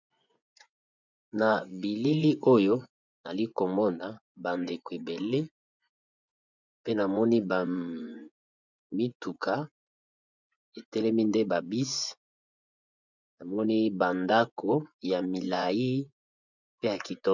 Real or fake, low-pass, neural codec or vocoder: fake; 7.2 kHz; vocoder, 24 kHz, 100 mel bands, Vocos